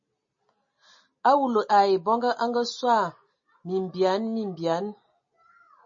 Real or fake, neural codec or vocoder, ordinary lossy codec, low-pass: real; none; MP3, 32 kbps; 7.2 kHz